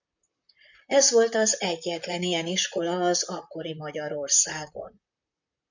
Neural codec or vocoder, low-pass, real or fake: vocoder, 44.1 kHz, 128 mel bands, Pupu-Vocoder; 7.2 kHz; fake